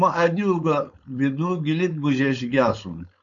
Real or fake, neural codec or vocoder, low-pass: fake; codec, 16 kHz, 4.8 kbps, FACodec; 7.2 kHz